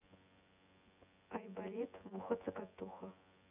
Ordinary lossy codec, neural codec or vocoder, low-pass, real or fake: none; vocoder, 24 kHz, 100 mel bands, Vocos; 3.6 kHz; fake